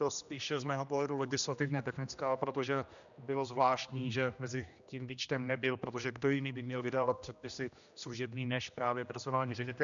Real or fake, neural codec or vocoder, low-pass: fake; codec, 16 kHz, 1 kbps, X-Codec, HuBERT features, trained on general audio; 7.2 kHz